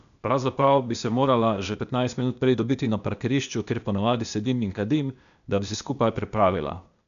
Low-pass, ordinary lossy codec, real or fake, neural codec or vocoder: 7.2 kHz; none; fake; codec, 16 kHz, 0.8 kbps, ZipCodec